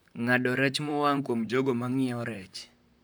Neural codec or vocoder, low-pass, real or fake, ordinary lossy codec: vocoder, 44.1 kHz, 128 mel bands, Pupu-Vocoder; none; fake; none